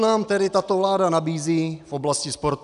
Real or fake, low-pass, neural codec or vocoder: real; 10.8 kHz; none